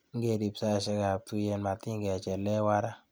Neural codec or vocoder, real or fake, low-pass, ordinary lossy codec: none; real; none; none